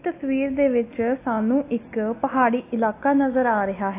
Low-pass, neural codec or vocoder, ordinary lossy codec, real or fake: 3.6 kHz; none; AAC, 24 kbps; real